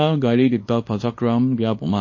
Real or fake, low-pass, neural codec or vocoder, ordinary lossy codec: fake; 7.2 kHz; codec, 24 kHz, 0.9 kbps, WavTokenizer, small release; MP3, 32 kbps